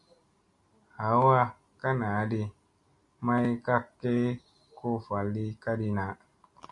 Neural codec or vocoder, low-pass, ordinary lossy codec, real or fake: none; 10.8 kHz; Opus, 64 kbps; real